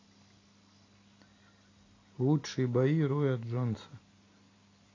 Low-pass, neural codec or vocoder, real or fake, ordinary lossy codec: 7.2 kHz; none; real; AAC, 32 kbps